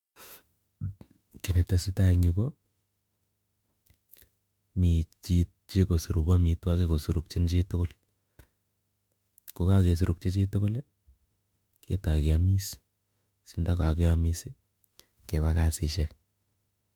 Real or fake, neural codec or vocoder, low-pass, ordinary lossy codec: fake; autoencoder, 48 kHz, 32 numbers a frame, DAC-VAE, trained on Japanese speech; 19.8 kHz; MP3, 96 kbps